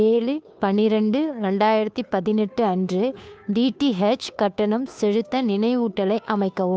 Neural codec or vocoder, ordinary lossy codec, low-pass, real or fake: codec, 16 kHz, 2 kbps, FunCodec, trained on Chinese and English, 25 frames a second; none; none; fake